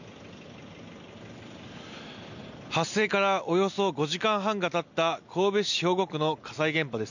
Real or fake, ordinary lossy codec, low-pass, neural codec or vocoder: real; none; 7.2 kHz; none